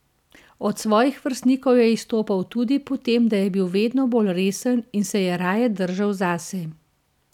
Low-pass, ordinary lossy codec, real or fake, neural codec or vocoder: 19.8 kHz; none; real; none